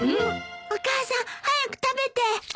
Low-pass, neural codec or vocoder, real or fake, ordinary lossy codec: none; none; real; none